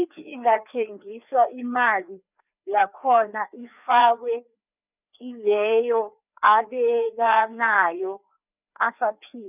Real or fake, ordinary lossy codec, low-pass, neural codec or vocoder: fake; none; 3.6 kHz; codec, 16 kHz, 2 kbps, FreqCodec, larger model